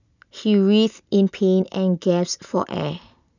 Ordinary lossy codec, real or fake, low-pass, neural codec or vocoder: none; real; 7.2 kHz; none